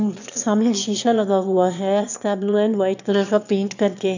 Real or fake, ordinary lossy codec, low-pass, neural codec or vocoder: fake; none; 7.2 kHz; autoencoder, 22.05 kHz, a latent of 192 numbers a frame, VITS, trained on one speaker